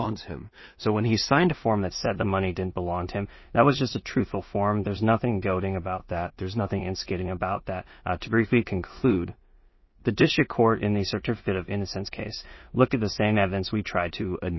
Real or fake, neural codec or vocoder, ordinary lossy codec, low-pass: fake; codec, 16 kHz in and 24 kHz out, 0.4 kbps, LongCat-Audio-Codec, two codebook decoder; MP3, 24 kbps; 7.2 kHz